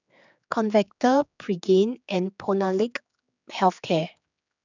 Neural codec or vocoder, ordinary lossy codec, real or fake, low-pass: codec, 16 kHz, 4 kbps, X-Codec, HuBERT features, trained on general audio; none; fake; 7.2 kHz